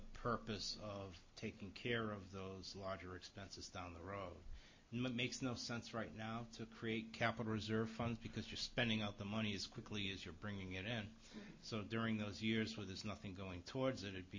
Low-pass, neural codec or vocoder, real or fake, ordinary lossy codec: 7.2 kHz; none; real; MP3, 32 kbps